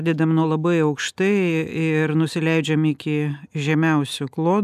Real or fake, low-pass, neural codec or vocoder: real; 14.4 kHz; none